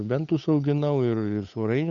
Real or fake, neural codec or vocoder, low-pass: fake; codec, 16 kHz, 8 kbps, FunCodec, trained on Chinese and English, 25 frames a second; 7.2 kHz